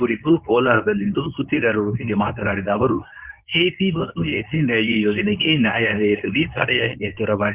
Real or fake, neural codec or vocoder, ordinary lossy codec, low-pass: fake; codec, 24 kHz, 0.9 kbps, WavTokenizer, medium speech release version 2; Opus, 24 kbps; 3.6 kHz